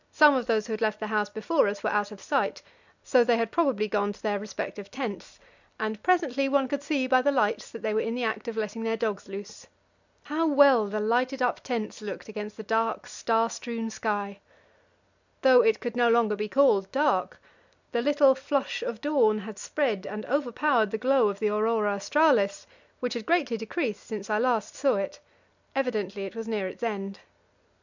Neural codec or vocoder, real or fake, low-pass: none; real; 7.2 kHz